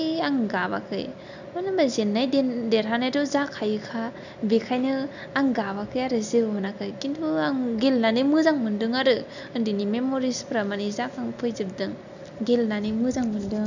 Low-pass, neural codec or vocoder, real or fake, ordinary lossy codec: 7.2 kHz; none; real; none